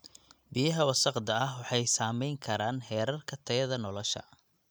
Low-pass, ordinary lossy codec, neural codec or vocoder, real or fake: none; none; none; real